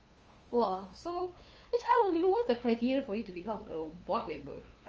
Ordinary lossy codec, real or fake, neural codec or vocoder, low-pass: Opus, 24 kbps; fake; codec, 16 kHz, 2 kbps, FunCodec, trained on LibriTTS, 25 frames a second; 7.2 kHz